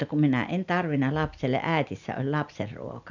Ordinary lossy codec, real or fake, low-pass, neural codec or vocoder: none; real; 7.2 kHz; none